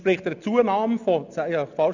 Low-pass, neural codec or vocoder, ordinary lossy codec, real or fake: 7.2 kHz; none; none; real